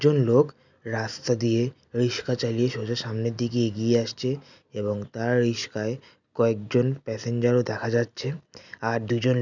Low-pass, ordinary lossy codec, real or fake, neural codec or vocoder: 7.2 kHz; none; real; none